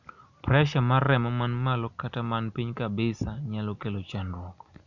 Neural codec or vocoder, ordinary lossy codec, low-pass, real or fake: none; none; 7.2 kHz; real